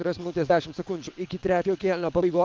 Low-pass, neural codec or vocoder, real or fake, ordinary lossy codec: 7.2 kHz; vocoder, 22.05 kHz, 80 mel bands, WaveNeXt; fake; Opus, 32 kbps